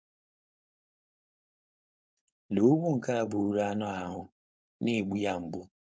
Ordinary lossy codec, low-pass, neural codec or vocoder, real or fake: none; none; codec, 16 kHz, 4.8 kbps, FACodec; fake